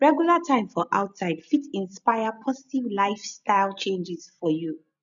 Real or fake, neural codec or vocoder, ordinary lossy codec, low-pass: real; none; none; 7.2 kHz